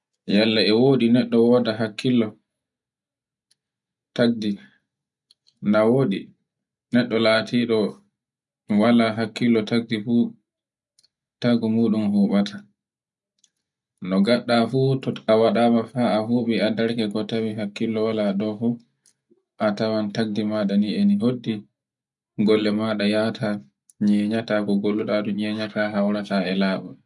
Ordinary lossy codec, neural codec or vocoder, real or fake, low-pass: none; none; real; 10.8 kHz